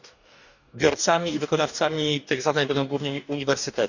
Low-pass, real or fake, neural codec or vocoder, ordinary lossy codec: 7.2 kHz; fake; codec, 44.1 kHz, 2.6 kbps, DAC; none